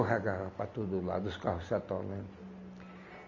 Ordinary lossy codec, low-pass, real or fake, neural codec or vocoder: none; 7.2 kHz; real; none